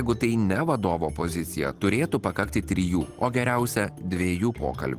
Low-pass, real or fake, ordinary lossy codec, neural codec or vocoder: 14.4 kHz; real; Opus, 24 kbps; none